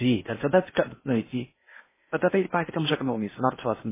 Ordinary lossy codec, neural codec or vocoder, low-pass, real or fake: MP3, 16 kbps; codec, 16 kHz in and 24 kHz out, 0.6 kbps, FocalCodec, streaming, 4096 codes; 3.6 kHz; fake